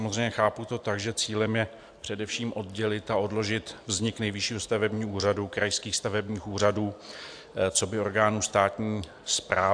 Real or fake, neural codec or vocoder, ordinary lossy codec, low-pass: real; none; AAC, 64 kbps; 9.9 kHz